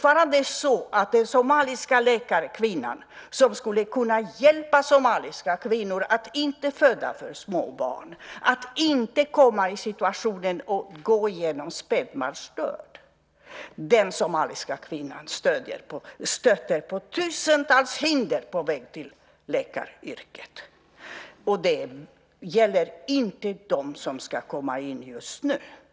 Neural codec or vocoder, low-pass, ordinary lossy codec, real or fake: none; none; none; real